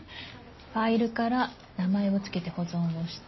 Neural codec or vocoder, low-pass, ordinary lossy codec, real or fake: none; 7.2 kHz; MP3, 24 kbps; real